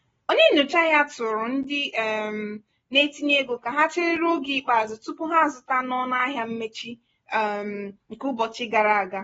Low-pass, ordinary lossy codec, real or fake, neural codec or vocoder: 19.8 kHz; AAC, 24 kbps; real; none